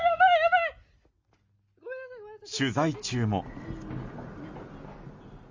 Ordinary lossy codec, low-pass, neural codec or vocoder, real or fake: Opus, 32 kbps; 7.2 kHz; none; real